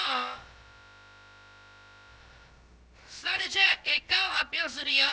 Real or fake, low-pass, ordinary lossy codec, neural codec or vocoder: fake; none; none; codec, 16 kHz, about 1 kbps, DyCAST, with the encoder's durations